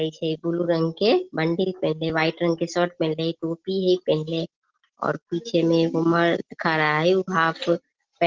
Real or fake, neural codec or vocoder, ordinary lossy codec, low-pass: real; none; Opus, 16 kbps; 7.2 kHz